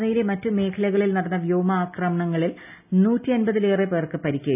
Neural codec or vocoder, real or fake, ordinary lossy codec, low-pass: none; real; none; 3.6 kHz